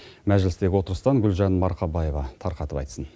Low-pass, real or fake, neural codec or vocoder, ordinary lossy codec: none; real; none; none